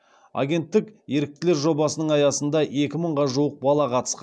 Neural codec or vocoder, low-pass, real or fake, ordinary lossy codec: none; none; real; none